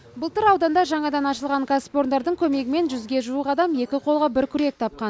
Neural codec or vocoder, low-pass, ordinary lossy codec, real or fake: none; none; none; real